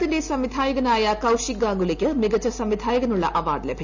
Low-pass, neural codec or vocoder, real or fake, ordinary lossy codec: 7.2 kHz; none; real; none